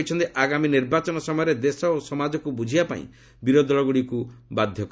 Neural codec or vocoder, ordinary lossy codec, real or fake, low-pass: none; none; real; none